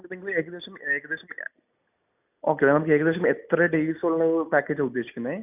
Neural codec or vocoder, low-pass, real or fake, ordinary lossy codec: none; 3.6 kHz; real; none